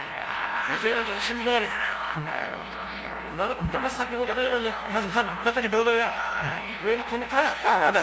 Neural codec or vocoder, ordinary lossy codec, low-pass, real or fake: codec, 16 kHz, 0.5 kbps, FunCodec, trained on LibriTTS, 25 frames a second; none; none; fake